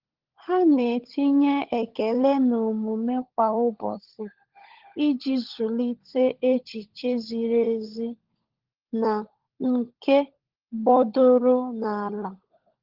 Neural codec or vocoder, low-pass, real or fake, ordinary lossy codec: codec, 16 kHz, 16 kbps, FunCodec, trained on LibriTTS, 50 frames a second; 5.4 kHz; fake; Opus, 16 kbps